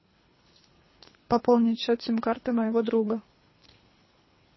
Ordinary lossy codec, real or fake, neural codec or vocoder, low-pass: MP3, 24 kbps; fake; codec, 24 kHz, 3 kbps, HILCodec; 7.2 kHz